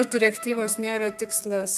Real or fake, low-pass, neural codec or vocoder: fake; 14.4 kHz; codec, 32 kHz, 1.9 kbps, SNAC